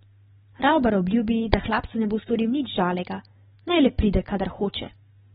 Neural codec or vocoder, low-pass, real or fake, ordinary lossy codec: none; 19.8 kHz; real; AAC, 16 kbps